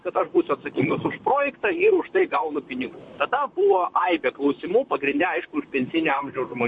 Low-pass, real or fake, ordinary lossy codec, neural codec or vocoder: 10.8 kHz; fake; MP3, 48 kbps; vocoder, 44.1 kHz, 128 mel bands, Pupu-Vocoder